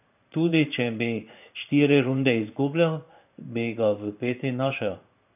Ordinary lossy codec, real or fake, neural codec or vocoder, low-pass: none; fake; codec, 16 kHz in and 24 kHz out, 1 kbps, XY-Tokenizer; 3.6 kHz